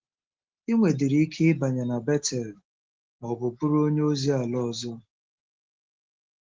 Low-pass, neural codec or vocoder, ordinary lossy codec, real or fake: 7.2 kHz; none; Opus, 16 kbps; real